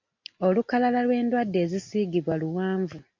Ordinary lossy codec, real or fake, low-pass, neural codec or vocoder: MP3, 48 kbps; real; 7.2 kHz; none